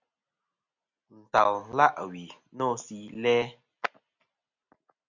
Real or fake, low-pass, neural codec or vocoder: real; 7.2 kHz; none